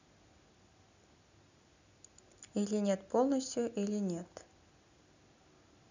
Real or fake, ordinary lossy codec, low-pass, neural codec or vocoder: real; none; 7.2 kHz; none